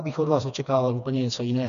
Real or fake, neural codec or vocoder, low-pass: fake; codec, 16 kHz, 2 kbps, FreqCodec, smaller model; 7.2 kHz